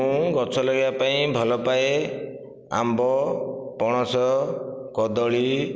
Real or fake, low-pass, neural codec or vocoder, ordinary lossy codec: real; none; none; none